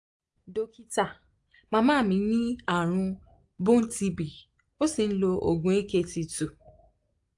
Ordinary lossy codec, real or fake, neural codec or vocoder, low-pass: AAC, 64 kbps; real; none; 10.8 kHz